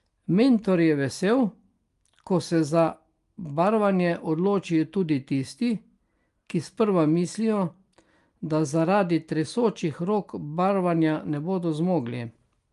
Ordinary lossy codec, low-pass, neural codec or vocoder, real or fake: Opus, 32 kbps; 10.8 kHz; none; real